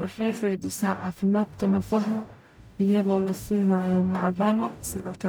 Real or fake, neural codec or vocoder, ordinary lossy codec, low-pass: fake; codec, 44.1 kHz, 0.9 kbps, DAC; none; none